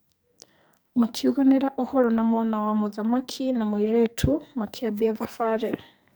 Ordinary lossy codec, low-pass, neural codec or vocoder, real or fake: none; none; codec, 44.1 kHz, 2.6 kbps, SNAC; fake